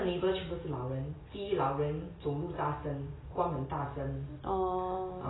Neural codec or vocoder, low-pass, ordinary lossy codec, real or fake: none; 7.2 kHz; AAC, 16 kbps; real